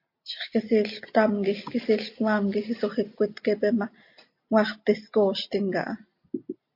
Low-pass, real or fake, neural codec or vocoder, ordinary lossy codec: 5.4 kHz; real; none; MP3, 32 kbps